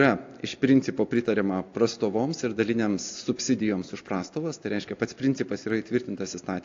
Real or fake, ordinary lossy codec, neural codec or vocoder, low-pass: real; AAC, 48 kbps; none; 7.2 kHz